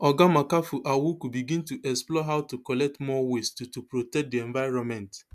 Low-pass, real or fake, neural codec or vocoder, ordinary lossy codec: 14.4 kHz; real; none; MP3, 96 kbps